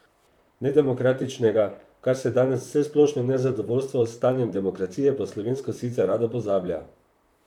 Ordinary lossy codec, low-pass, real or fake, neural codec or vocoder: none; 19.8 kHz; fake; vocoder, 44.1 kHz, 128 mel bands, Pupu-Vocoder